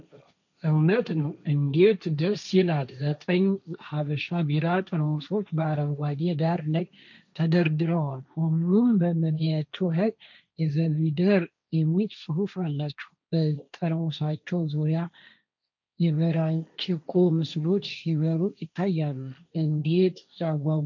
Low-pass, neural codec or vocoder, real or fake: 7.2 kHz; codec, 16 kHz, 1.1 kbps, Voila-Tokenizer; fake